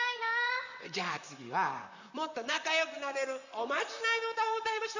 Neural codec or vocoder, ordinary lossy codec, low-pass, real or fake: vocoder, 44.1 kHz, 128 mel bands, Pupu-Vocoder; none; 7.2 kHz; fake